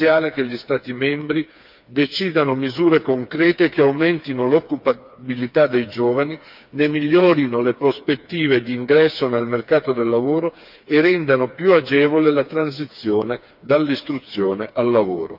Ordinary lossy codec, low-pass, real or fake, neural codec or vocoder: none; 5.4 kHz; fake; codec, 16 kHz, 4 kbps, FreqCodec, smaller model